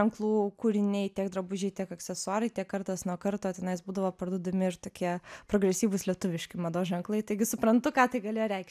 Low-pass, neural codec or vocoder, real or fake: 14.4 kHz; none; real